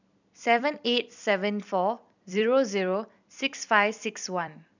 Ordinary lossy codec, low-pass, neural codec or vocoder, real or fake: none; 7.2 kHz; vocoder, 44.1 kHz, 128 mel bands every 256 samples, BigVGAN v2; fake